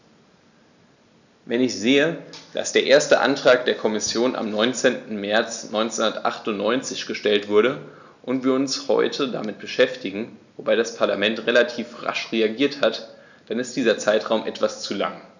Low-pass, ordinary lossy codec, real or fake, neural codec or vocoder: 7.2 kHz; none; real; none